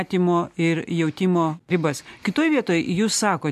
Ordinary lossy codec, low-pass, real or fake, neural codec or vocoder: MP3, 64 kbps; 14.4 kHz; real; none